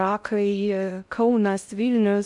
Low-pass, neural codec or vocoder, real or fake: 10.8 kHz; codec, 16 kHz in and 24 kHz out, 0.6 kbps, FocalCodec, streaming, 2048 codes; fake